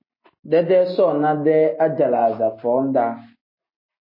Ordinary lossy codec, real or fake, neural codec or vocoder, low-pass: MP3, 24 kbps; real; none; 5.4 kHz